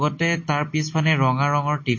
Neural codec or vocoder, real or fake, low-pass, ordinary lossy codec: none; real; 7.2 kHz; MP3, 32 kbps